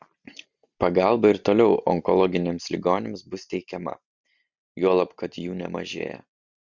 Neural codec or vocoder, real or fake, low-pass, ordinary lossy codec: none; real; 7.2 kHz; Opus, 64 kbps